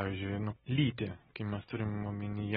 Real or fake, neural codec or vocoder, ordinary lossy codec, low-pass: real; none; AAC, 16 kbps; 19.8 kHz